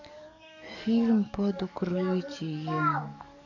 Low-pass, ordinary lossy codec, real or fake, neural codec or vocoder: 7.2 kHz; none; fake; codec, 44.1 kHz, 7.8 kbps, DAC